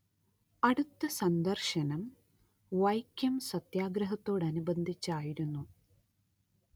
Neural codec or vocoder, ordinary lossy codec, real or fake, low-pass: none; none; real; none